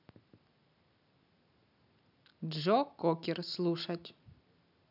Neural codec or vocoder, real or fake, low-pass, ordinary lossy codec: none; real; 5.4 kHz; none